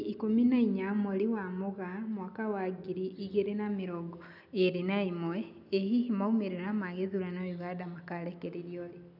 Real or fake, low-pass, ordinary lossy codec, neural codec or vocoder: real; 5.4 kHz; none; none